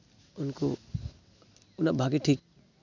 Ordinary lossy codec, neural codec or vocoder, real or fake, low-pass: none; none; real; 7.2 kHz